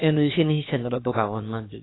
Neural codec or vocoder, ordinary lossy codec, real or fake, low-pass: codec, 16 kHz, about 1 kbps, DyCAST, with the encoder's durations; AAC, 16 kbps; fake; 7.2 kHz